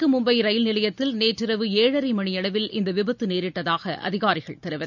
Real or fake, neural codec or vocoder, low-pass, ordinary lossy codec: real; none; 7.2 kHz; none